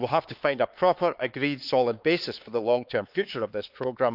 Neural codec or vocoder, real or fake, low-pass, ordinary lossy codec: codec, 16 kHz, 4 kbps, X-Codec, HuBERT features, trained on LibriSpeech; fake; 5.4 kHz; Opus, 24 kbps